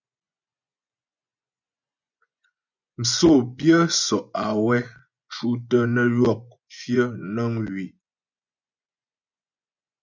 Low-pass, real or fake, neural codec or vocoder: 7.2 kHz; fake; vocoder, 24 kHz, 100 mel bands, Vocos